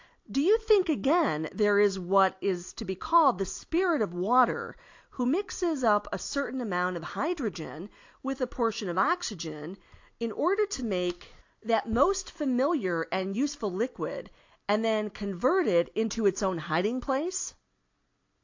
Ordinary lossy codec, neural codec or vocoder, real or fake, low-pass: AAC, 48 kbps; none; real; 7.2 kHz